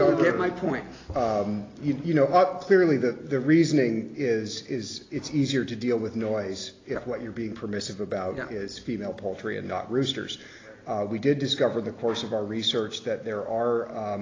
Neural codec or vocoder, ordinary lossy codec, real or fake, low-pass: none; AAC, 32 kbps; real; 7.2 kHz